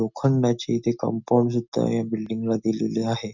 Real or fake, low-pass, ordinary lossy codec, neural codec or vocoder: real; 7.2 kHz; none; none